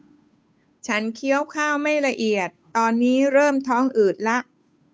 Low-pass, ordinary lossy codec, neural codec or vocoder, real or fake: none; none; codec, 16 kHz, 8 kbps, FunCodec, trained on Chinese and English, 25 frames a second; fake